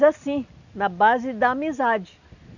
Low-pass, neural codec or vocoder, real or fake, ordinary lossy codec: 7.2 kHz; none; real; none